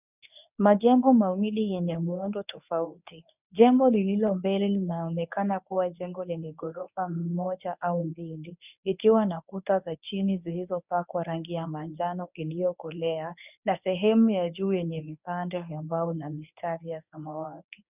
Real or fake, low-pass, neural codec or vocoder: fake; 3.6 kHz; codec, 24 kHz, 0.9 kbps, WavTokenizer, medium speech release version 1